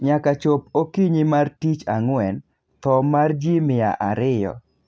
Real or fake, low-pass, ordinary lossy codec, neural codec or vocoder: real; none; none; none